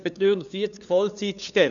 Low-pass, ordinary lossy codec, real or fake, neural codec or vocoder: 7.2 kHz; none; fake; codec, 16 kHz, 2 kbps, FunCodec, trained on LibriTTS, 25 frames a second